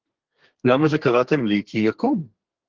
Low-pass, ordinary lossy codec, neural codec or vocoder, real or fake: 7.2 kHz; Opus, 16 kbps; codec, 32 kHz, 1.9 kbps, SNAC; fake